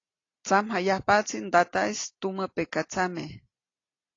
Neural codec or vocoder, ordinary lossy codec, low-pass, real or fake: none; AAC, 32 kbps; 7.2 kHz; real